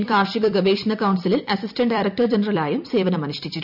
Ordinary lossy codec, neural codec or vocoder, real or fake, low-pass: none; vocoder, 44.1 kHz, 128 mel bands every 256 samples, BigVGAN v2; fake; 5.4 kHz